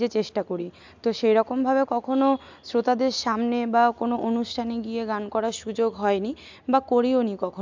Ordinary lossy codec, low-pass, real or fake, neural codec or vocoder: MP3, 64 kbps; 7.2 kHz; real; none